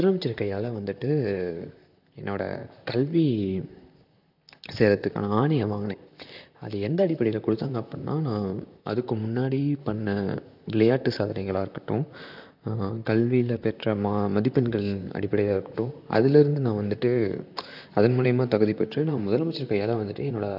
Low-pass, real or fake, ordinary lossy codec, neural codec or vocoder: 5.4 kHz; fake; AAC, 48 kbps; vocoder, 44.1 kHz, 128 mel bands, Pupu-Vocoder